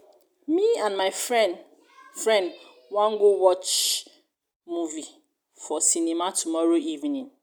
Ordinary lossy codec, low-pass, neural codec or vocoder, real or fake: none; none; none; real